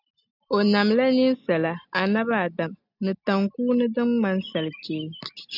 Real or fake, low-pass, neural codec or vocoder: real; 5.4 kHz; none